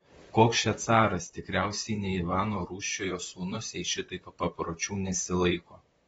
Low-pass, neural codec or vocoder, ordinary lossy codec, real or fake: 19.8 kHz; vocoder, 44.1 kHz, 128 mel bands, Pupu-Vocoder; AAC, 24 kbps; fake